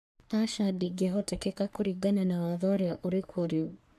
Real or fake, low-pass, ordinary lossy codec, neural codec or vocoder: fake; 14.4 kHz; MP3, 96 kbps; codec, 44.1 kHz, 3.4 kbps, Pupu-Codec